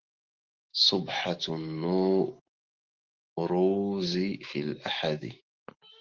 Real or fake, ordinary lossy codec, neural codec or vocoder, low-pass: fake; Opus, 16 kbps; autoencoder, 48 kHz, 128 numbers a frame, DAC-VAE, trained on Japanese speech; 7.2 kHz